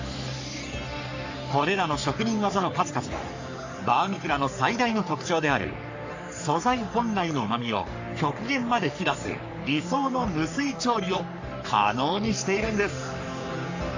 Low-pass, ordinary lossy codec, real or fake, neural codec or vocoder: 7.2 kHz; AAC, 48 kbps; fake; codec, 44.1 kHz, 3.4 kbps, Pupu-Codec